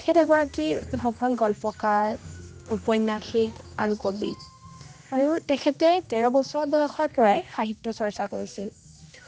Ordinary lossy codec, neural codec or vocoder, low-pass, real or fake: none; codec, 16 kHz, 1 kbps, X-Codec, HuBERT features, trained on general audio; none; fake